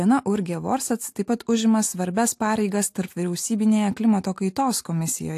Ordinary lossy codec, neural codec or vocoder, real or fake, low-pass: AAC, 64 kbps; none; real; 14.4 kHz